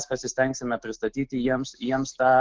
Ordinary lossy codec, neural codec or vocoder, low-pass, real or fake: Opus, 16 kbps; autoencoder, 48 kHz, 128 numbers a frame, DAC-VAE, trained on Japanese speech; 7.2 kHz; fake